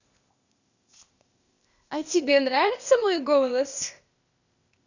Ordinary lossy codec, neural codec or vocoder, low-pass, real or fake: none; codec, 16 kHz, 0.8 kbps, ZipCodec; 7.2 kHz; fake